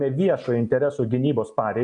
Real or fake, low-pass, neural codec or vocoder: real; 9.9 kHz; none